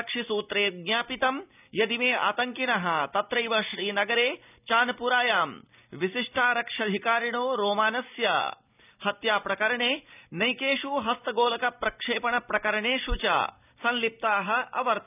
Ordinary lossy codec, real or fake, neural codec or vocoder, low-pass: none; real; none; 3.6 kHz